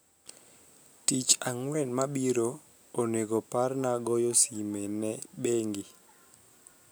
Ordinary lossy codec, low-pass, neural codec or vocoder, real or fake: none; none; none; real